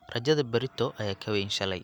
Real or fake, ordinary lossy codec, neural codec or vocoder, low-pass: real; none; none; 19.8 kHz